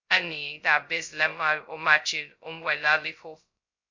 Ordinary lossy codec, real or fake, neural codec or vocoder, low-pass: MP3, 64 kbps; fake; codec, 16 kHz, 0.2 kbps, FocalCodec; 7.2 kHz